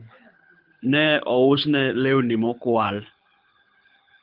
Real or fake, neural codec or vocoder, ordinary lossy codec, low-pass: fake; codec, 24 kHz, 6 kbps, HILCodec; Opus, 24 kbps; 5.4 kHz